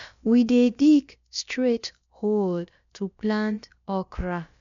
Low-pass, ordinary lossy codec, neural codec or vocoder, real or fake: 7.2 kHz; MP3, 64 kbps; codec, 16 kHz, about 1 kbps, DyCAST, with the encoder's durations; fake